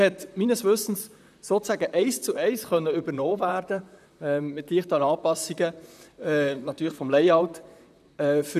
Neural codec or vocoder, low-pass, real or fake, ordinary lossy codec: vocoder, 44.1 kHz, 128 mel bands, Pupu-Vocoder; 14.4 kHz; fake; none